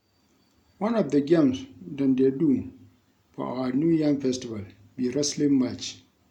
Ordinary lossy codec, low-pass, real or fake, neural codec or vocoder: none; 19.8 kHz; real; none